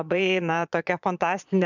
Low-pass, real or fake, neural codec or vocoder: 7.2 kHz; fake; vocoder, 22.05 kHz, 80 mel bands, Vocos